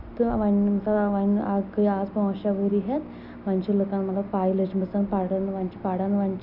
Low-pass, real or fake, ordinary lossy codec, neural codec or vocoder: 5.4 kHz; real; none; none